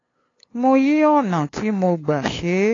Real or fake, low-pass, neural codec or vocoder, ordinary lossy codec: fake; 7.2 kHz; codec, 16 kHz, 2 kbps, FunCodec, trained on LibriTTS, 25 frames a second; AAC, 32 kbps